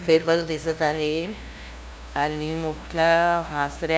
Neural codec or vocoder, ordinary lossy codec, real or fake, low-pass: codec, 16 kHz, 0.5 kbps, FunCodec, trained on LibriTTS, 25 frames a second; none; fake; none